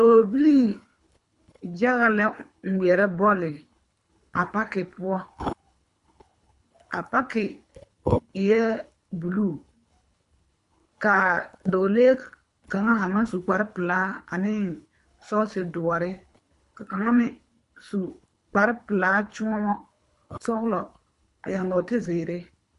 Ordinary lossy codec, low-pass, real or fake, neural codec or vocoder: MP3, 64 kbps; 10.8 kHz; fake; codec, 24 kHz, 3 kbps, HILCodec